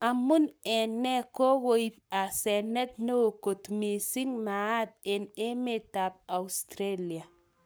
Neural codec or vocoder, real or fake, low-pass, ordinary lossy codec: codec, 44.1 kHz, 7.8 kbps, Pupu-Codec; fake; none; none